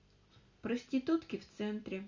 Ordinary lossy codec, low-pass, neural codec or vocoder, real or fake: Opus, 64 kbps; 7.2 kHz; none; real